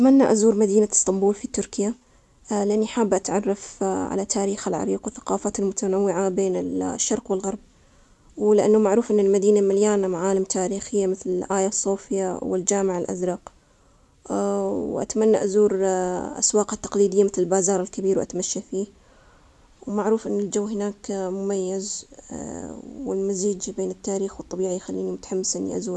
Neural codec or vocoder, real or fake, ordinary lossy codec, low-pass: none; real; none; none